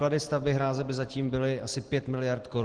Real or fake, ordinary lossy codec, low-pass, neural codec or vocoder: real; Opus, 16 kbps; 9.9 kHz; none